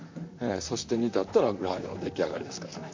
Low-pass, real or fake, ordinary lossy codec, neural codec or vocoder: 7.2 kHz; fake; MP3, 64 kbps; vocoder, 44.1 kHz, 128 mel bands, Pupu-Vocoder